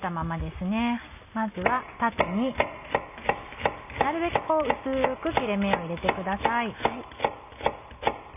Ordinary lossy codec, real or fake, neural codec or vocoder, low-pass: none; real; none; 3.6 kHz